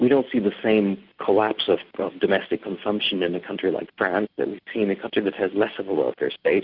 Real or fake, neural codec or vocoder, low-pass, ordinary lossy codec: real; none; 5.4 kHz; Opus, 32 kbps